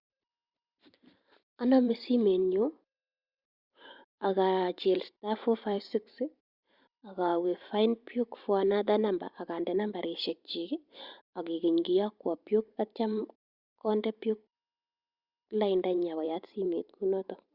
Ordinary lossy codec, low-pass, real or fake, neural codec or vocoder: Opus, 64 kbps; 5.4 kHz; fake; vocoder, 44.1 kHz, 128 mel bands every 512 samples, BigVGAN v2